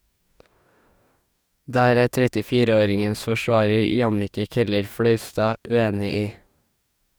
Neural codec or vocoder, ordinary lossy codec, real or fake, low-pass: codec, 44.1 kHz, 2.6 kbps, DAC; none; fake; none